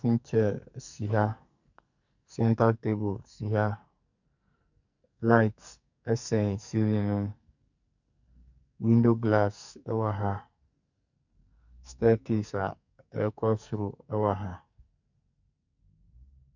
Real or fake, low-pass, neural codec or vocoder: fake; 7.2 kHz; codec, 44.1 kHz, 2.6 kbps, SNAC